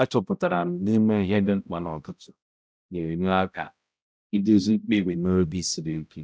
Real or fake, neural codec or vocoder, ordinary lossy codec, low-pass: fake; codec, 16 kHz, 0.5 kbps, X-Codec, HuBERT features, trained on balanced general audio; none; none